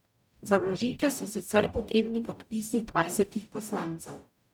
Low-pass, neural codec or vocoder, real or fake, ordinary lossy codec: 19.8 kHz; codec, 44.1 kHz, 0.9 kbps, DAC; fake; none